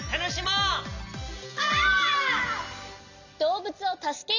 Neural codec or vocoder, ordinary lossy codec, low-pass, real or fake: none; none; 7.2 kHz; real